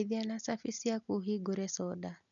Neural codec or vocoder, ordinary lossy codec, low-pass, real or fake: none; none; 7.2 kHz; real